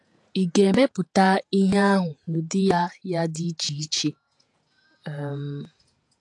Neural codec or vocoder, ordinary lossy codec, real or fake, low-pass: vocoder, 48 kHz, 128 mel bands, Vocos; AAC, 64 kbps; fake; 10.8 kHz